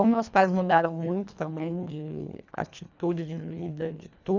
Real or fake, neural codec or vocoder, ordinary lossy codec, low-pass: fake; codec, 24 kHz, 1.5 kbps, HILCodec; none; 7.2 kHz